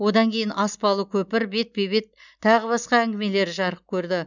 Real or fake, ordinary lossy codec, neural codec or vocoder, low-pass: real; none; none; 7.2 kHz